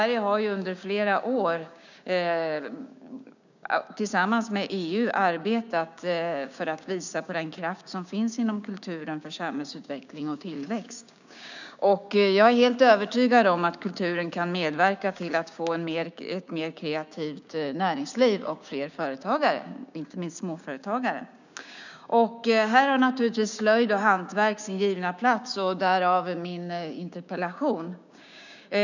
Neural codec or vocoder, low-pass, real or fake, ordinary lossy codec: codec, 16 kHz, 6 kbps, DAC; 7.2 kHz; fake; none